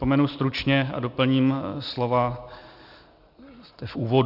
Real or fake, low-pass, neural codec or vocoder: real; 5.4 kHz; none